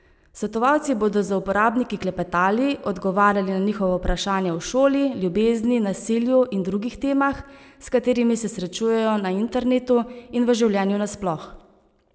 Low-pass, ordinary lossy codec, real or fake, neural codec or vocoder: none; none; real; none